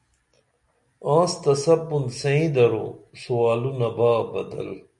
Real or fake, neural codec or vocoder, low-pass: real; none; 10.8 kHz